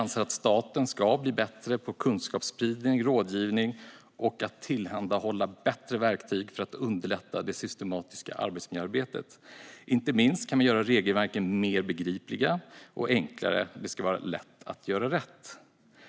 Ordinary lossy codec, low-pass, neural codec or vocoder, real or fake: none; none; none; real